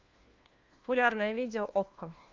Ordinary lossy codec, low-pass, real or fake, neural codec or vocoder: Opus, 24 kbps; 7.2 kHz; fake; codec, 16 kHz, 1 kbps, FunCodec, trained on LibriTTS, 50 frames a second